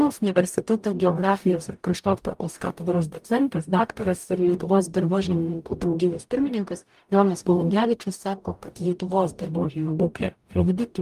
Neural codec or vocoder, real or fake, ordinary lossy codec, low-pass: codec, 44.1 kHz, 0.9 kbps, DAC; fake; Opus, 32 kbps; 14.4 kHz